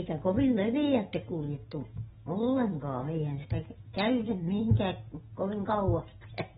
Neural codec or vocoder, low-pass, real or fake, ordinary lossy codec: none; 19.8 kHz; real; AAC, 16 kbps